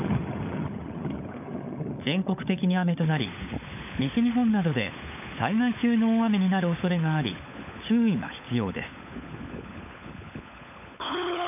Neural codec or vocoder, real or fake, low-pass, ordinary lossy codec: codec, 16 kHz, 16 kbps, FunCodec, trained on LibriTTS, 50 frames a second; fake; 3.6 kHz; none